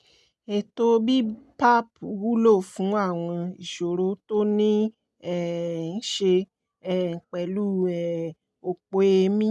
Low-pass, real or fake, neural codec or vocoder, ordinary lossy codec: none; real; none; none